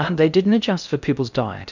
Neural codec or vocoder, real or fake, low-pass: codec, 16 kHz in and 24 kHz out, 0.6 kbps, FocalCodec, streaming, 2048 codes; fake; 7.2 kHz